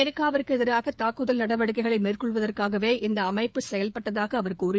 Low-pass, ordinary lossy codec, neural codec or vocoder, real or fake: none; none; codec, 16 kHz, 8 kbps, FreqCodec, smaller model; fake